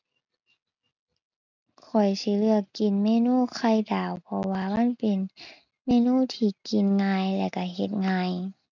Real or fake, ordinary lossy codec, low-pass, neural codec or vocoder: real; none; 7.2 kHz; none